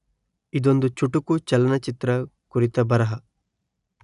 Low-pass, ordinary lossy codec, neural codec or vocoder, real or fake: 10.8 kHz; none; none; real